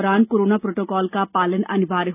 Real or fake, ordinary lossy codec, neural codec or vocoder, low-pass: real; none; none; 3.6 kHz